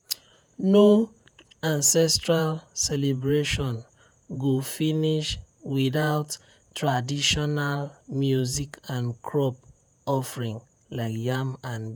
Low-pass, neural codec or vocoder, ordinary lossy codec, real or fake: none; vocoder, 48 kHz, 128 mel bands, Vocos; none; fake